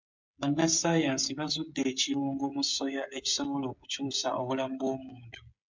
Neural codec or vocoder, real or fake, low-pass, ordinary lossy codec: codec, 16 kHz, 16 kbps, FreqCodec, smaller model; fake; 7.2 kHz; MP3, 64 kbps